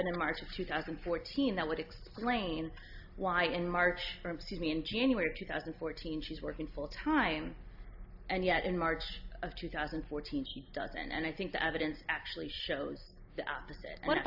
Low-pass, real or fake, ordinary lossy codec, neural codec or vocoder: 5.4 kHz; real; AAC, 48 kbps; none